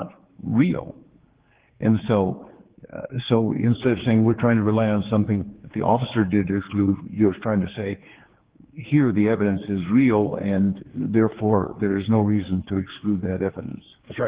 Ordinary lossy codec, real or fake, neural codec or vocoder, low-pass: Opus, 16 kbps; fake; codec, 16 kHz, 4 kbps, X-Codec, HuBERT features, trained on general audio; 3.6 kHz